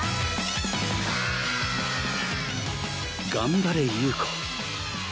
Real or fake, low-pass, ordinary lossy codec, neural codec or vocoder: real; none; none; none